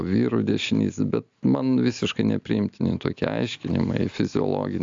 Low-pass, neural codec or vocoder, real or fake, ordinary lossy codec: 7.2 kHz; none; real; AAC, 64 kbps